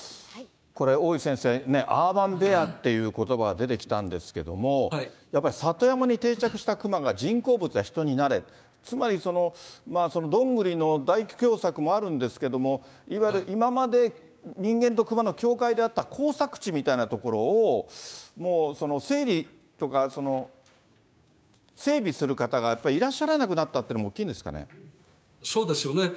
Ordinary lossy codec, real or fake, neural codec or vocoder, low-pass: none; fake; codec, 16 kHz, 6 kbps, DAC; none